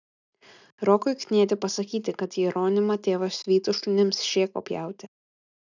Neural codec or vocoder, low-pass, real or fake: none; 7.2 kHz; real